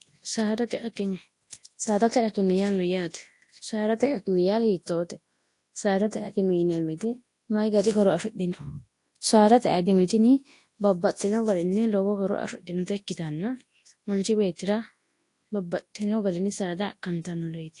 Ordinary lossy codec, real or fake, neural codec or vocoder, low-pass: AAC, 48 kbps; fake; codec, 24 kHz, 0.9 kbps, WavTokenizer, large speech release; 10.8 kHz